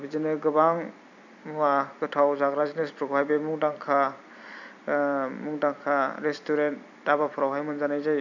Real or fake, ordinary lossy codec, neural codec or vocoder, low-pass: fake; none; vocoder, 44.1 kHz, 128 mel bands every 256 samples, BigVGAN v2; 7.2 kHz